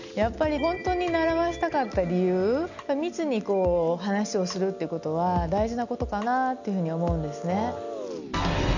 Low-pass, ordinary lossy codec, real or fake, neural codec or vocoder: 7.2 kHz; none; real; none